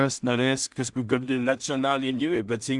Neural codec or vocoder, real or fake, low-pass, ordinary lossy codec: codec, 16 kHz in and 24 kHz out, 0.4 kbps, LongCat-Audio-Codec, two codebook decoder; fake; 10.8 kHz; Opus, 64 kbps